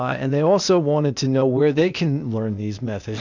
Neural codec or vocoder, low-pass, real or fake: codec, 16 kHz, 0.8 kbps, ZipCodec; 7.2 kHz; fake